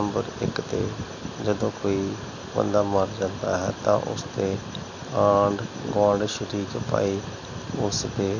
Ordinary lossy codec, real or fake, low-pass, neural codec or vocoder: none; real; 7.2 kHz; none